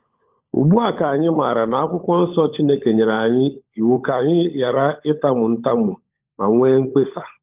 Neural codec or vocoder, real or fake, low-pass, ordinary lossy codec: codec, 16 kHz, 16 kbps, FunCodec, trained on LibriTTS, 50 frames a second; fake; 3.6 kHz; Opus, 32 kbps